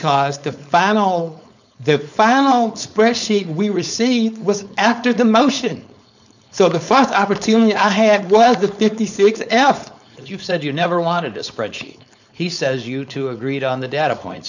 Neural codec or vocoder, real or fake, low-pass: codec, 16 kHz, 4.8 kbps, FACodec; fake; 7.2 kHz